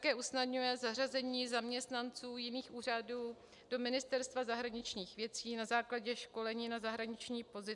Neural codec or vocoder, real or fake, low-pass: none; real; 10.8 kHz